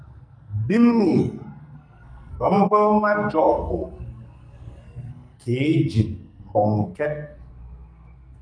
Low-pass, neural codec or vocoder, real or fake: 9.9 kHz; codec, 44.1 kHz, 2.6 kbps, SNAC; fake